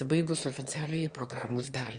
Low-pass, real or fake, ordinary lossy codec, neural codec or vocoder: 9.9 kHz; fake; Opus, 64 kbps; autoencoder, 22.05 kHz, a latent of 192 numbers a frame, VITS, trained on one speaker